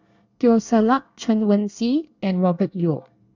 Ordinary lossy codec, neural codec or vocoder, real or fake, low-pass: none; codec, 24 kHz, 1 kbps, SNAC; fake; 7.2 kHz